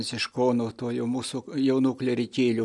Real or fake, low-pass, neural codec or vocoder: real; 10.8 kHz; none